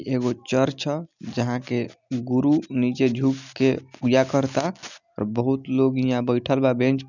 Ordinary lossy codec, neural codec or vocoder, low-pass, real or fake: none; none; 7.2 kHz; real